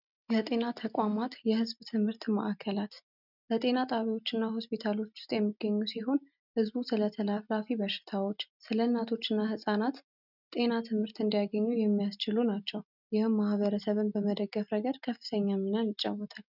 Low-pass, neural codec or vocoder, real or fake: 5.4 kHz; none; real